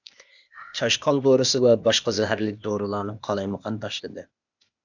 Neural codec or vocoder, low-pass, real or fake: codec, 16 kHz, 0.8 kbps, ZipCodec; 7.2 kHz; fake